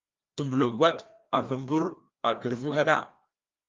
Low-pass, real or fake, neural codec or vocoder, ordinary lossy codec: 7.2 kHz; fake; codec, 16 kHz, 1 kbps, FreqCodec, larger model; Opus, 32 kbps